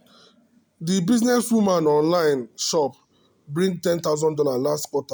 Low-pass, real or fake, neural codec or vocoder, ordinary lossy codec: none; real; none; none